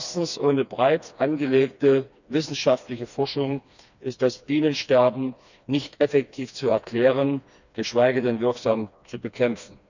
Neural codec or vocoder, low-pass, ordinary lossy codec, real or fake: codec, 16 kHz, 2 kbps, FreqCodec, smaller model; 7.2 kHz; none; fake